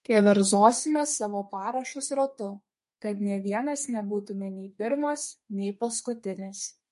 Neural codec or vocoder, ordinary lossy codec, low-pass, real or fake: codec, 32 kHz, 1.9 kbps, SNAC; MP3, 48 kbps; 14.4 kHz; fake